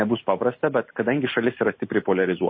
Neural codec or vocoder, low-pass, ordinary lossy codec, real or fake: none; 7.2 kHz; MP3, 24 kbps; real